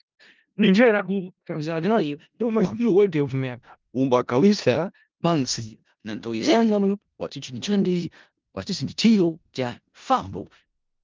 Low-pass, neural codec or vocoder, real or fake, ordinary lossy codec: 7.2 kHz; codec, 16 kHz in and 24 kHz out, 0.4 kbps, LongCat-Audio-Codec, four codebook decoder; fake; Opus, 24 kbps